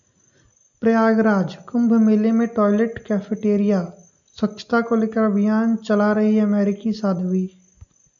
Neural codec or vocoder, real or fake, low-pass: none; real; 7.2 kHz